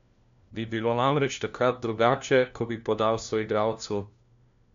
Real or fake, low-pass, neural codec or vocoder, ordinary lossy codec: fake; 7.2 kHz; codec, 16 kHz, 1 kbps, FunCodec, trained on LibriTTS, 50 frames a second; MP3, 64 kbps